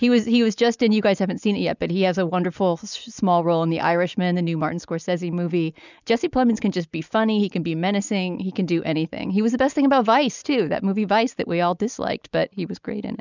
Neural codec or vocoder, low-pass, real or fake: none; 7.2 kHz; real